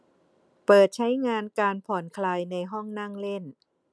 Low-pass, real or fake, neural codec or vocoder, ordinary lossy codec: none; real; none; none